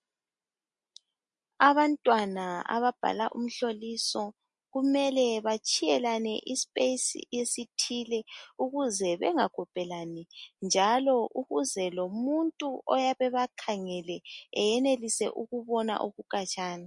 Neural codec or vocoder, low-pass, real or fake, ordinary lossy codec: none; 10.8 kHz; real; MP3, 48 kbps